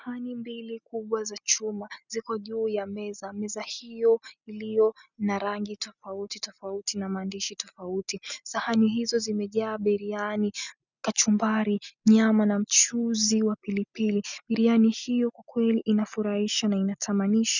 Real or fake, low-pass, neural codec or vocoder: real; 7.2 kHz; none